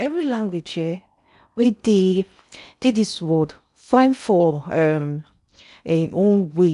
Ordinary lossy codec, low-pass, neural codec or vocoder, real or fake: none; 10.8 kHz; codec, 16 kHz in and 24 kHz out, 0.6 kbps, FocalCodec, streaming, 4096 codes; fake